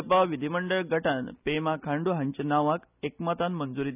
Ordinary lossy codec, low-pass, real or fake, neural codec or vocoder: none; 3.6 kHz; real; none